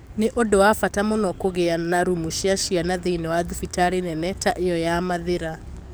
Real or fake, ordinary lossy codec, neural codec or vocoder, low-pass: fake; none; codec, 44.1 kHz, 7.8 kbps, DAC; none